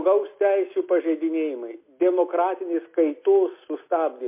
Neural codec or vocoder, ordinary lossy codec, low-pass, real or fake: none; MP3, 24 kbps; 3.6 kHz; real